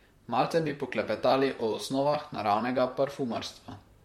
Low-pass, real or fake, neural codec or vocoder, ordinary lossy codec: 19.8 kHz; fake; vocoder, 44.1 kHz, 128 mel bands, Pupu-Vocoder; MP3, 64 kbps